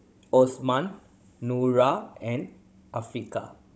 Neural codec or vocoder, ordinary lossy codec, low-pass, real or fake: codec, 16 kHz, 16 kbps, FunCodec, trained on Chinese and English, 50 frames a second; none; none; fake